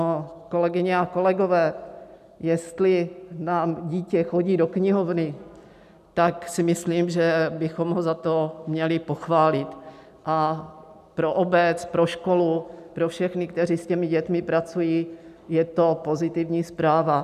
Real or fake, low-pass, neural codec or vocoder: real; 14.4 kHz; none